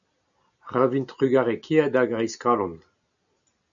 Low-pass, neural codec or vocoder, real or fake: 7.2 kHz; none; real